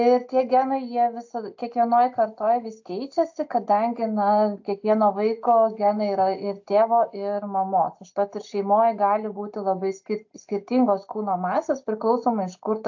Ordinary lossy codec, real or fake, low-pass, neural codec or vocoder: AAC, 48 kbps; real; 7.2 kHz; none